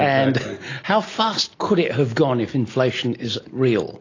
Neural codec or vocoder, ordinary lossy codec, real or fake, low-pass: none; AAC, 32 kbps; real; 7.2 kHz